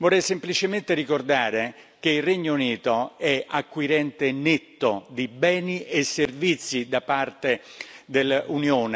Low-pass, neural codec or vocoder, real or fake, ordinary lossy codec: none; none; real; none